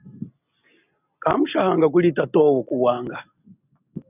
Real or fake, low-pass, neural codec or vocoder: real; 3.6 kHz; none